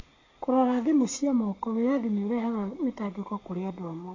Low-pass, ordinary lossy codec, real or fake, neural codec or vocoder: 7.2 kHz; AAC, 32 kbps; fake; codec, 44.1 kHz, 7.8 kbps, Pupu-Codec